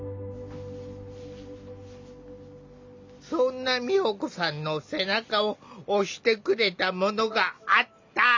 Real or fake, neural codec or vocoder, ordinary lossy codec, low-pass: real; none; MP3, 64 kbps; 7.2 kHz